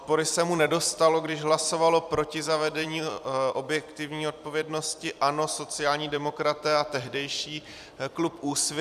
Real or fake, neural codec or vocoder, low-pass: fake; vocoder, 44.1 kHz, 128 mel bands every 256 samples, BigVGAN v2; 14.4 kHz